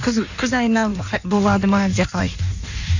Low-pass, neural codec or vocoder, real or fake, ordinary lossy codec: 7.2 kHz; codec, 16 kHz in and 24 kHz out, 1.1 kbps, FireRedTTS-2 codec; fake; none